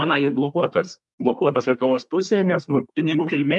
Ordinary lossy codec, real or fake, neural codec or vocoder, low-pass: MP3, 96 kbps; fake; codec, 24 kHz, 1 kbps, SNAC; 10.8 kHz